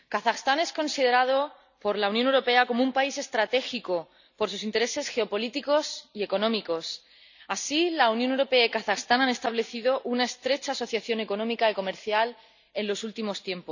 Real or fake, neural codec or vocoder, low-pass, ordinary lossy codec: real; none; 7.2 kHz; none